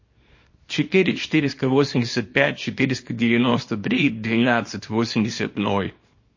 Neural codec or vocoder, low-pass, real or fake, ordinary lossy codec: codec, 24 kHz, 0.9 kbps, WavTokenizer, small release; 7.2 kHz; fake; MP3, 32 kbps